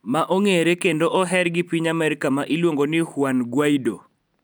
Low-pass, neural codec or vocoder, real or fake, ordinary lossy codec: none; none; real; none